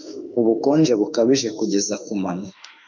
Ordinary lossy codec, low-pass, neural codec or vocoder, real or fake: MP3, 64 kbps; 7.2 kHz; autoencoder, 48 kHz, 32 numbers a frame, DAC-VAE, trained on Japanese speech; fake